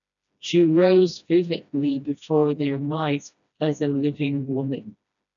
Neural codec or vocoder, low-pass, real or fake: codec, 16 kHz, 1 kbps, FreqCodec, smaller model; 7.2 kHz; fake